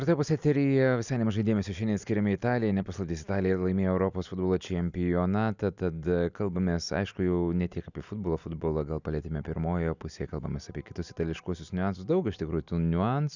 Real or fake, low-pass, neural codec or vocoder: real; 7.2 kHz; none